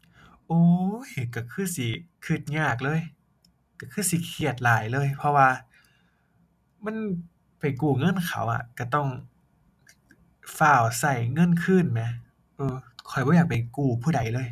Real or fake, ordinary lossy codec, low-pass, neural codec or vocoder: real; none; 14.4 kHz; none